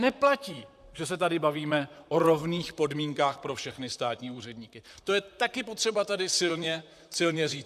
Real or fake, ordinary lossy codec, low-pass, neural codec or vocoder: fake; AAC, 96 kbps; 14.4 kHz; vocoder, 44.1 kHz, 128 mel bands, Pupu-Vocoder